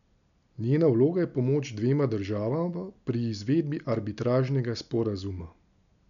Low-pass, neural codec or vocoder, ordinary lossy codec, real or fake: 7.2 kHz; none; none; real